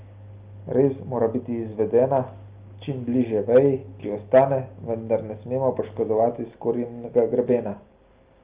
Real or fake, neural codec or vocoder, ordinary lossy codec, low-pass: real; none; Opus, 32 kbps; 3.6 kHz